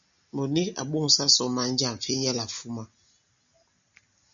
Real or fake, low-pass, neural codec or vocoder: real; 7.2 kHz; none